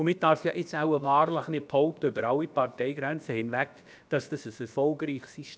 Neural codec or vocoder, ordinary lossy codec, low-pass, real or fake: codec, 16 kHz, about 1 kbps, DyCAST, with the encoder's durations; none; none; fake